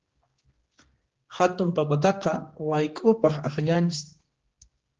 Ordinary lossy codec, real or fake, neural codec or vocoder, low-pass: Opus, 16 kbps; fake; codec, 16 kHz, 2 kbps, X-Codec, HuBERT features, trained on general audio; 7.2 kHz